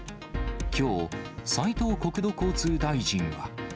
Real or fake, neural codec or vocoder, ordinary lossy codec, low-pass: real; none; none; none